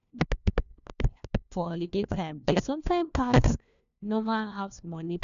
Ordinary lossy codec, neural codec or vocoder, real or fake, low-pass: MP3, 96 kbps; codec, 16 kHz, 1 kbps, FunCodec, trained on LibriTTS, 50 frames a second; fake; 7.2 kHz